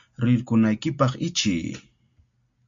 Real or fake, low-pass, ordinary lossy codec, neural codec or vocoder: real; 7.2 kHz; MP3, 48 kbps; none